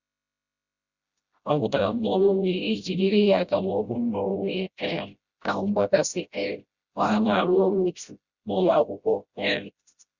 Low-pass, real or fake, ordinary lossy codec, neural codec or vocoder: 7.2 kHz; fake; Opus, 64 kbps; codec, 16 kHz, 0.5 kbps, FreqCodec, smaller model